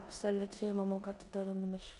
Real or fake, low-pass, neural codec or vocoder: fake; 10.8 kHz; codec, 16 kHz in and 24 kHz out, 0.9 kbps, LongCat-Audio-Codec, four codebook decoder